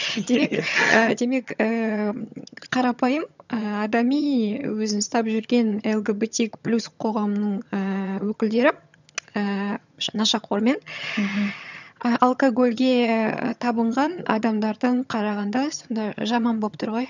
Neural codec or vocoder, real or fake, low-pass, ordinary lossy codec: vocoder, 22.05 kHz, 80 mel bands, HiFi-GAN; fake; 7.2 kHz; none